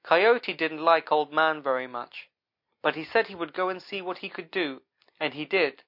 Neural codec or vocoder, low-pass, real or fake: none; 5.4 kHz; real